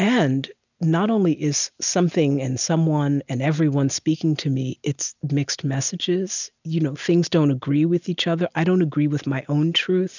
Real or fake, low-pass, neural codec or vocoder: real; 7.2 kHz; none